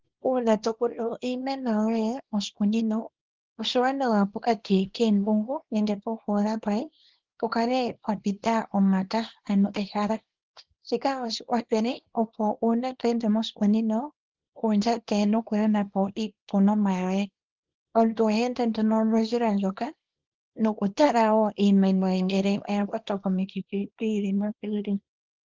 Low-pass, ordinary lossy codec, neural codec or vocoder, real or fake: 7.2 kHz; Opus, 16 kbps; codec, 24 kHz, 0.9 kbps, WavTokenizer, small release; fake